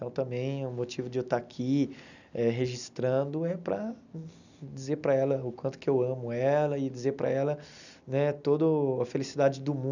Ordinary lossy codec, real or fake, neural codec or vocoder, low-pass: none; real; none; 7.2 kHz